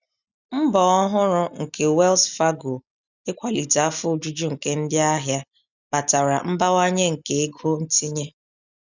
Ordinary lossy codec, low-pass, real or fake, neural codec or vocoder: none; 7.2 kHz; real; none